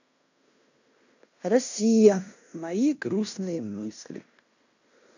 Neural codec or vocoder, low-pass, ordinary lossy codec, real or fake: codec, 16 kHz in and 24 kHz out, 0.9 kbps, LongCat-Audio-Codec, fine tuned four codebook decoder; 7.2 kHz; none; fake